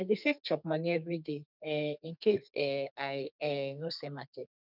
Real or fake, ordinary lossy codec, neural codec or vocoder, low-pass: fake; none; codec, 32 kHz, 1.9 kbps, SNAC; 5.4 kHz